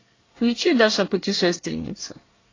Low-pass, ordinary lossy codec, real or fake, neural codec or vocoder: 7.2 kHz; AAC, 32 kbps; fake; codec, 24 kHz, 1 kbps, SNAC